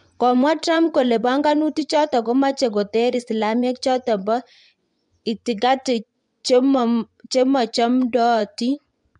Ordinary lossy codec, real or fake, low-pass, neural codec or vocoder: MP3, 64 kbps; fake; 10.8 kHz; vocoder, 24 kHz, 100 mel bands, Vocos